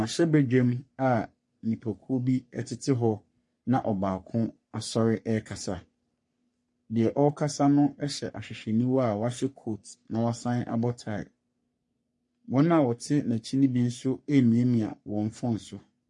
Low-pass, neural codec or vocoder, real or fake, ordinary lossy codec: 10.8 kHz; codec, 44.1 kHz, 3.4 kbps, Pupu-Codec; fake; MP3, 48 kbps